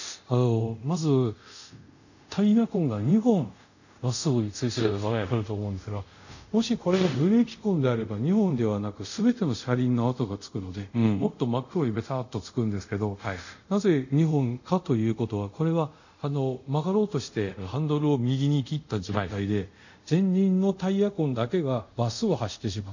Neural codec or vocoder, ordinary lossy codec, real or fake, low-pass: codec, 24 kHz, 0.5 kbps, DualCodec; none; fake; 7.2 kHz